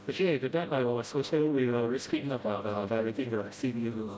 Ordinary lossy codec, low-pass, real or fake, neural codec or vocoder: none; none; fake; codec, 16 kHz, 0.5 kbps, FreqCodec, smaller model